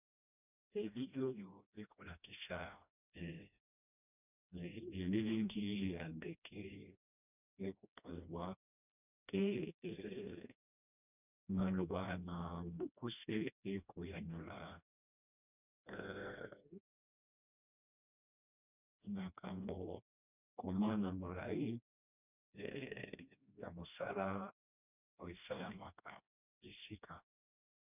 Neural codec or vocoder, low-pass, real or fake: codec, 16 kHz, 1 kbps, FreqCodec, smaller model; 3.6 kHz; fake